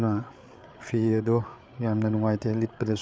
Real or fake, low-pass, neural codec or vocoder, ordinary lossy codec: fake; none; codec, 16 kHz, 8 kbps, FreqCodec, larger model; none